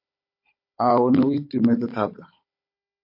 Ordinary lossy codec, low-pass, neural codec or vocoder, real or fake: MP3, 32 kbps; 5.4 kHz; codec, 16 kHz, 16 kbps, FunCodec, trained on Chinese and English, 50 frames a second; fake